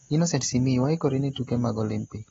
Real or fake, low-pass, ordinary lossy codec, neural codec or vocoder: real; 7.2 kHz; AAC, 24 kbps; none